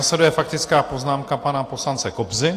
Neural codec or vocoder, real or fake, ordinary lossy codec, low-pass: none; real; AAC, 48 kbps; 14.4 kHz